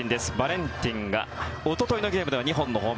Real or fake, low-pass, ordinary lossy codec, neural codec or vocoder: real; none; none; none